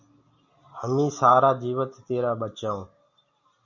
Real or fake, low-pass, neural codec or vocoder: real; 7.2 kHz; none